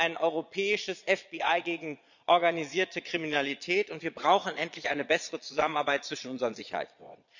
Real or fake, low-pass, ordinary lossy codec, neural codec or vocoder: fake; 7.2 kHz; none; vocoder, 22.05 kHz, 80 mel bands, Vocos